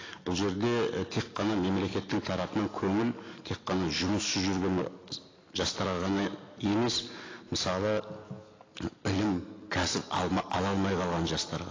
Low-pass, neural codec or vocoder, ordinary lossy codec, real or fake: 7.2 kHz; none; AAC, 32 kbps; real